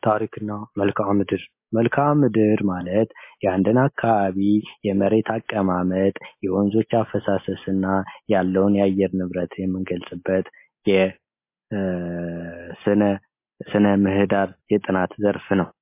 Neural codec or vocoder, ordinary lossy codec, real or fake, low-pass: none; MP3, 24 kbps; real; 3.6 kHz